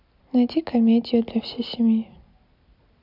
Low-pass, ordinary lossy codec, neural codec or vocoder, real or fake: 5.4 kHz; none; none; real